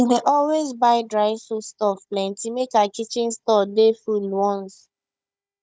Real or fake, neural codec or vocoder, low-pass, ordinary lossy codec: fake; codec, 16 kHz, 16 kbps, FunCodec, trained on Chinese and English, 50 frames a second; none; none